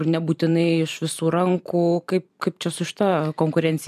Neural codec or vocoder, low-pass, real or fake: vocoder, 44.1 kHz, 128 mel bands every 512 samples, BigVGAN v2; 14.4 kHz; fake